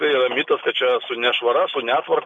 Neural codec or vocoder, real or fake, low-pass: none; real; 9.9 kHz